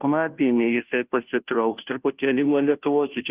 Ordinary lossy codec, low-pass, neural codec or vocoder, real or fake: Opus, 24 kbps; 3.6 kHz; codec, 16 kHz, 0.5 kbps, FunCodec, trained on Chinese and English, 25 frames a second; fake